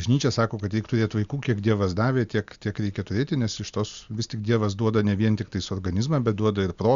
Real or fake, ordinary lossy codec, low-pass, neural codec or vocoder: real; Opus, 64 kbps; 7.2 kHz; none